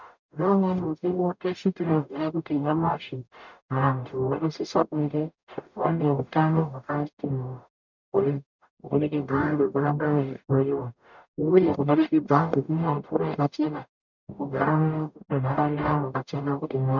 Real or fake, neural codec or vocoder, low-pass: fake; codec, 44.1 kHz, 0.9 kbps, DAC; 7.2 kHz